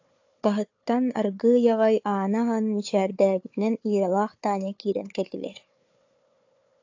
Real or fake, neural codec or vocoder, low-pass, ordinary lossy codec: fake; codec, 16 kHz, 4 kbps, FunCodec, trained on Chinese and English, 50 frames a second; 7.2 kHz; AAC, 48 kbps